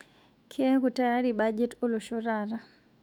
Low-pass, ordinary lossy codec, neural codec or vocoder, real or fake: 19.8 kHz; none; autoencoder, 48 kHz, 128 numbers a frame, DAC-VAE, trained on Japanese speech; fake